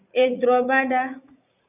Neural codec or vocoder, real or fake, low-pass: vocoder, 44.1 kHz, 80 mel bands, Vocos; fake; 3.6 kHz